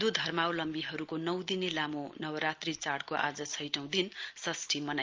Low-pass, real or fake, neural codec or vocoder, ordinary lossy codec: 7.2 kHz; real; none; Opus, 24 kbps